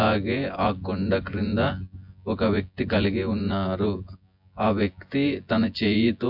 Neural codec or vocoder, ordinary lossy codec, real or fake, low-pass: vocoder, 24 kHz, 100 mel bands, Vocos; MP3, 48 kbps; fake; 5.4 kHz